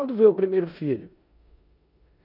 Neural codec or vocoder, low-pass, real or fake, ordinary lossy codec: codec, 16 kHz in and 24 kHz out, 0.9 kbps, LongCat-Audio-Codec, four codebook decoder; 5.4 kHz; fake; none